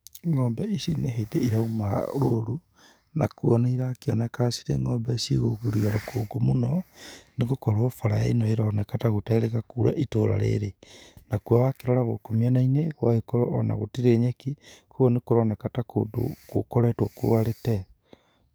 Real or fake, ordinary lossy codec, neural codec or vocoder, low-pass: fake; none; codec, 44.1 kHz, 7.8 kbps, DAC; none